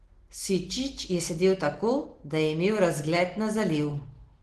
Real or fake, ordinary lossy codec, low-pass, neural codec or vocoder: real; Opus, 16 kbps; 10.8 kHz; none